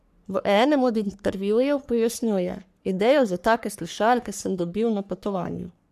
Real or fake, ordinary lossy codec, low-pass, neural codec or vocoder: fake; none; 14.4 kHz; codec, 44.1 kHz, 3.4 kbps, Pupu-Codec